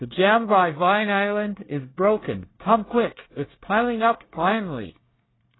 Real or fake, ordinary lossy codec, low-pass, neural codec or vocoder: fake; AAC, 16 kbps; 7.2 kHz; codec, 24 kHz, 1 kbps, SNAC